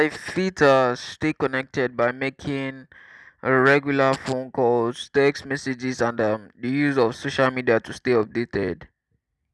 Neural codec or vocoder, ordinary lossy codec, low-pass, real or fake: none; none; none; real